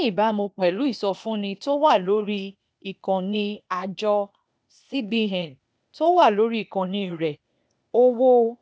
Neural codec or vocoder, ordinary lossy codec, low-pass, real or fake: codec, 16 kHz, 0.8 kbps, ZipCodec; none; none; fake